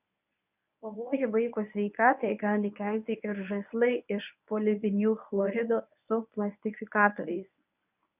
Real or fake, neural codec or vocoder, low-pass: fake; codec, 24 kHz, 0.9 kbps, WavTokenizer, medium speech release version 1; 3.6 kHz